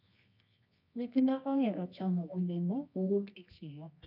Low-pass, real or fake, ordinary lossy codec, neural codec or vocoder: 5.4 kHz; fake; none; codec, 24 kHz, 0.9 kbps, WavTokenizer, medium music audio release